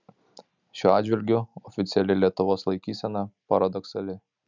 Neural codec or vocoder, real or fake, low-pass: none; real; 7.2 kHz